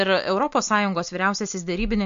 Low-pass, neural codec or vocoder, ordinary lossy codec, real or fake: 7.2 kHz; none; MP3, 48 kbps; real